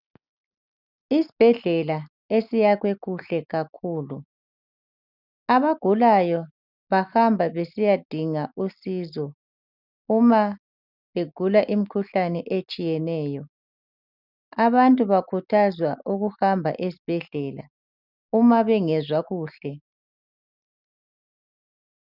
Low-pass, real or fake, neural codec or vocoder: 5.4 kHz; real; none